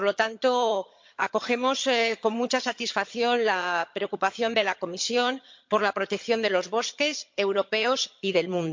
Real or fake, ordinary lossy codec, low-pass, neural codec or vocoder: fake; MP3, 48 kbps; 7.2 kHz; vocoder, 22.05 kHz, 80 mel bands, HiFi-GAN